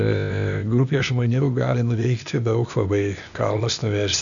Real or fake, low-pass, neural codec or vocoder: fake; 7.2 kHz; codec, 16 kHz, 0.8 kbps, ZipCodec